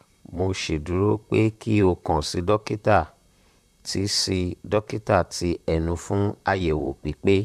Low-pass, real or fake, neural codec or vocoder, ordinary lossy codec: 14.4 kHz; fake; vocoder, 44.1 kHz, 128 mel bands, Pupu-Vocoder; none